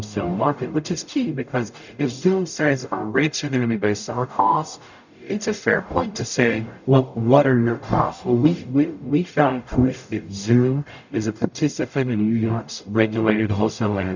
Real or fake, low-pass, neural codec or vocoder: fake; 7.2 kHz; codec, 44.1 kHz, 0.9 kbps, DAC